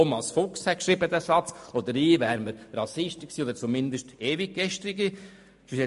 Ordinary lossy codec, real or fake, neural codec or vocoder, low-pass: MP3, 48 kbps; real; none; 14.4 kHz